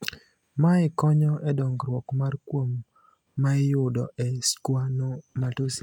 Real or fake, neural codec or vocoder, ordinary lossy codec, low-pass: real; none; none; 19.8 kHz